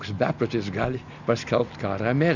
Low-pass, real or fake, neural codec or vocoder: 7.2 kHz; real; none